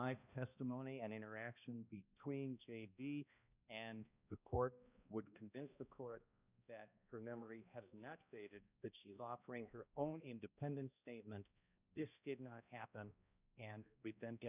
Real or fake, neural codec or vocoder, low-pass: fake; codec, 16 kHz, 1 kbps, X-Codec, HuBERT features, trained on balanced general audio; 3.6 kHz